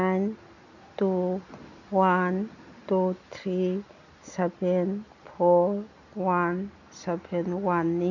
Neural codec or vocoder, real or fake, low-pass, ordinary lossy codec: none; real; 7.2 kHz; none